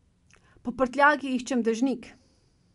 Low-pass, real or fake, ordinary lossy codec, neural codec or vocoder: 10.8 kHz; real; MP3, 64 kbps; none